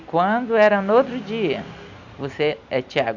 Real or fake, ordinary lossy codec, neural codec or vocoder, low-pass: real; none; none; 7.2 kHz